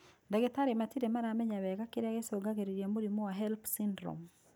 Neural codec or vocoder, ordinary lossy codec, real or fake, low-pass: none; none; real; none